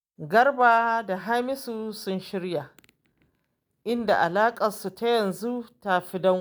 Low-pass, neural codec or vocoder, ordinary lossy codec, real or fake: none; none; none; real